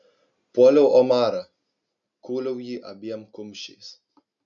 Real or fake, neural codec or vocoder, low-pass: real; none; 7.2 kHz